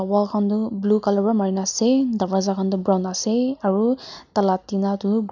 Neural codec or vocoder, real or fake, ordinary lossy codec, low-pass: none; real; none; 7.2 kHz